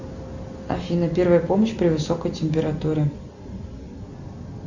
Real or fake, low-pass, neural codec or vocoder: real; 7.2 kHz; none